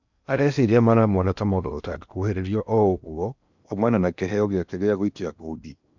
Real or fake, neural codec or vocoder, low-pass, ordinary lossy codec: fake; codec, 16 kHz in and 24 kHz out, 0.8 kbps, FocalCodec, streaming, 65536 codes; 7.2 kHz; none